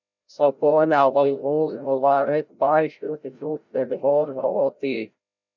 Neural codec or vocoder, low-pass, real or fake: codec, 16 kHz, 0.5 kbps, FreqCodec, larger model; 7.2 kHz; fake